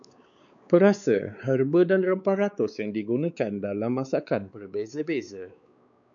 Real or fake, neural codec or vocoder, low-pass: fake; codec, 16 kHz, 4 kbps, X-Codec, WavLM features, trained on Multilingual LibriSpeech; 7.2 kHz